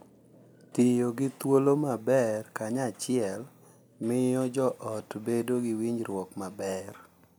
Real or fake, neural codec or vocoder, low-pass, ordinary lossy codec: real; none; none; none